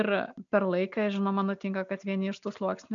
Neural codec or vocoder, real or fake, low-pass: none; real; 7.2 kHz